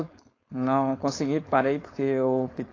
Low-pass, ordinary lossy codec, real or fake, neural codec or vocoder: 7.2 kHz; AAC, 32 kbps; fake; codec, 16 kHz, 4.8 kbps, FACodec